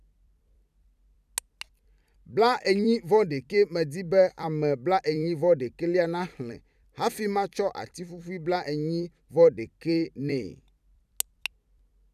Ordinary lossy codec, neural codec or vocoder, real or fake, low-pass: none; vocoder, 44.1 kHz, 128 mel bands every 256 samples, BigVGAN v2; fake; 14.4 kHz